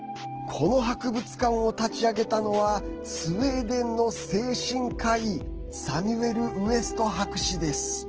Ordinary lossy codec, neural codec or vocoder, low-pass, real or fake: Opus, 16 kbps; none; 7.2 kHz; real